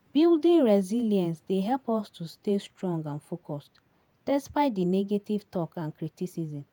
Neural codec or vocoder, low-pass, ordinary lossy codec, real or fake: vocoder, 48 kHz, 128 mel bands, Vocos; none; none; fake